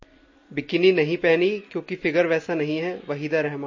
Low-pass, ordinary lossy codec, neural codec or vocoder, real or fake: 7.2 kHz; MP3, 32 kbps; none; real